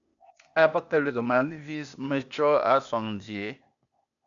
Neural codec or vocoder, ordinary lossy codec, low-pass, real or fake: codec, 16 kHz, 0.8 kbps, ZipCodec; MP3, 96 kbps; 7.2 kHz; fake